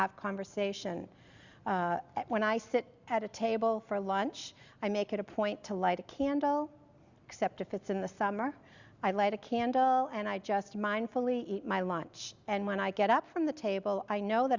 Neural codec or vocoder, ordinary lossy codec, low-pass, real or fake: none; Opus, 64 kbps; 7.2 kHz; real